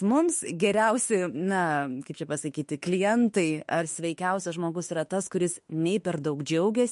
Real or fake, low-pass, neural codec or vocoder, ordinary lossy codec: fake; 14.4 kHz; autoencoder, 48 kHz, 32 numbers a frame, DAC-VAE, trained on Japanese speech; MP3, 48 kbps